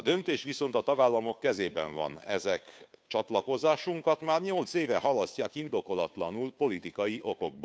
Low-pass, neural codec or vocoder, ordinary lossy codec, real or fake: none; codec, 16 kHz, 2 kbps, FunCodec, trained on Chinese and English, 25 frames a second; none; fake